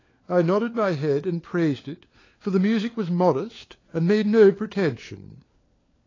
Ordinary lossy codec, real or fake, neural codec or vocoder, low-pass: AAC, 32 kbps; fake; codec, 16 kHz, 4 kbps, FunCodec, trained on LibriTTS, 50 frames a second; 7.2 kHz